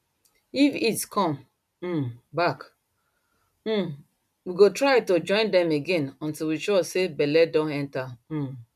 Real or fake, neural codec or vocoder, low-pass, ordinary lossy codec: real; none; 14.4 kHz; none